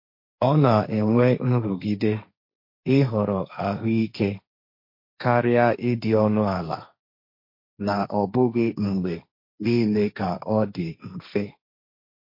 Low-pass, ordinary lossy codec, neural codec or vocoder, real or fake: 5.4 kHz; MP3, 32 kbps; codec, 16 kHz, 1.1 kbps, Voila-Tokenizer; fake